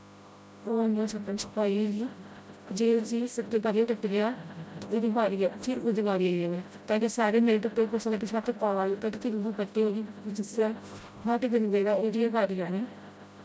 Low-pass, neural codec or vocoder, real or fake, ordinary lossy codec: none; codec, 16 kHz, 0.5 kbps, FreqCodec, smaller model; fake; none